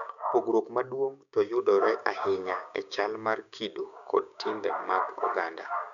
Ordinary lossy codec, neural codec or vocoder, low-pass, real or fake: none; codec, 16 kHz, 6 kbps, DAC; 7.2 kHz; fake